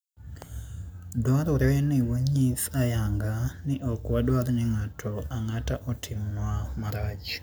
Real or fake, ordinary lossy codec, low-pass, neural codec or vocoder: real; none; none; none